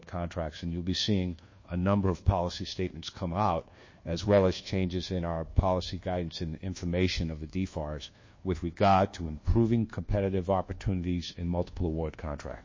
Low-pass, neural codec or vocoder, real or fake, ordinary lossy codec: 7.2 kHz; codec, 24 kHz, 1.2 kbps, DualCodec; fake; MP3, 32 kbps